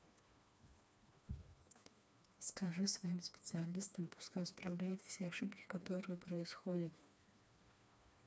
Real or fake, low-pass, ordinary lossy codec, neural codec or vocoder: fake; none; none; codec, 16 kHz, 2 kbps, FreqCodec, smaller model